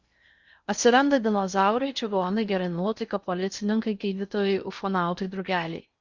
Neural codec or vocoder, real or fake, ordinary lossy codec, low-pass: codec, 16 kHz in and 24 kHz out, 0.6 kbps, FocalCodec, streaming, 2048 codes; fake; Opus, 64 kbps; 7.2 kHz